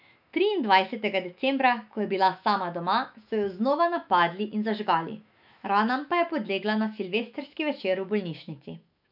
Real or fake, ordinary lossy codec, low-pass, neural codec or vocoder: fake; none; 5.4 kHz; autoencoder, 48 kHz, 128 numbers a frame, DAC-VAE, trained on Japanese speech